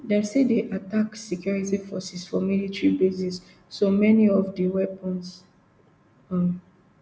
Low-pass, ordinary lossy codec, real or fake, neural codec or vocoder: none; none; real; none